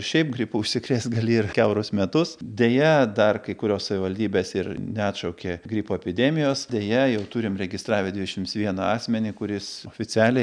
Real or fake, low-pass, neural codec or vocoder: real; 9.9 kHz; none